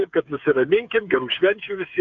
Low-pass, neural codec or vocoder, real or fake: 7.2 kHz; codec, 16 kHz, 8 kbps, FreqCodec, smaller model; fake